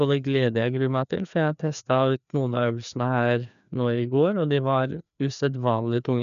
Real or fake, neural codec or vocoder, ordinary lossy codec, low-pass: fake; codec, 16 kHz, 2 kbps, FreqCodec, larger model; none; 7.2 kHz